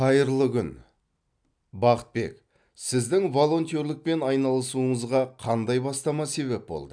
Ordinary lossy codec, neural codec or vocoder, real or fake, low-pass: none; none; real; 9.9 kHz